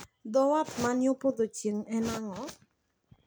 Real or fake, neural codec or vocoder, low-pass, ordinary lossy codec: real; none; none; none